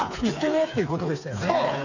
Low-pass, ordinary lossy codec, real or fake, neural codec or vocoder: 7.2 kHz; none; fake; codec, 16 kHz, 4 kbps, FreqCodec, smaller model